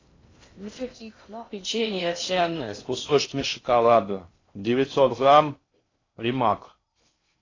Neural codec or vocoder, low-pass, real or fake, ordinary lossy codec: codec, 16 kHz in and 24 kHz out, 0.6 kbps, FocalCodec, streaming, 2048 codes; 7.2 kHz; fake; AAC, 32 kbps